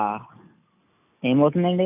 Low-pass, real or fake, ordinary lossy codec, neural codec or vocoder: 3.6 kHz; real; none; none